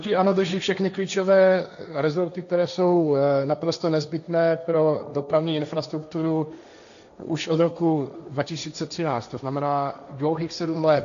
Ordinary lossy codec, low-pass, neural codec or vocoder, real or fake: MP3, 96 kbps; 7.2 kHz; codec, 16 kHz, 1.1 kbps, Voila-Tokenizer; fake